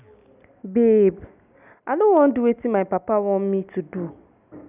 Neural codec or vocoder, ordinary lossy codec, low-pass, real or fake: none; none; 3.6 kHz; real